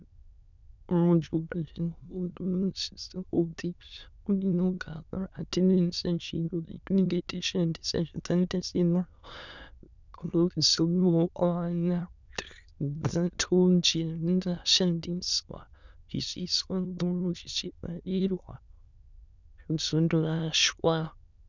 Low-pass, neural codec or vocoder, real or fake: 7.2 kHz; autoencoder, 22.05 kHz, a latent of 192 numbers a frame, VITS, trained on many speakers; fake